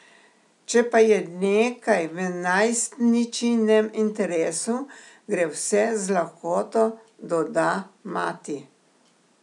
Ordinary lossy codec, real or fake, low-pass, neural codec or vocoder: none; real; 10.8 kHz; none